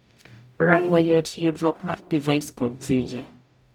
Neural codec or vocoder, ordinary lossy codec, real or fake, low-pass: codec, 44.1 kHz, 0.9 kbps, DAC; none; fake; 19.8 kHz